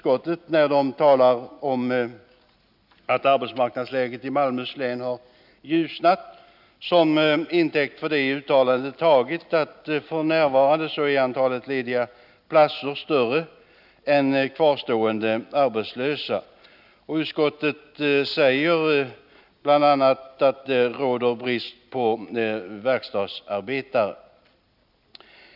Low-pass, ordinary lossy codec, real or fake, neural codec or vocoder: 5.4 kHz; none; real; none